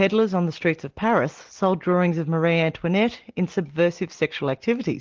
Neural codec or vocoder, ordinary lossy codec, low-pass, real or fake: none; Opus, 16 kbps; 7.2 kHz; real